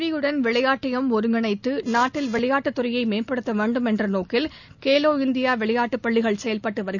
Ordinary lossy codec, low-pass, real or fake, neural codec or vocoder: none; 7.2 kHz; real; none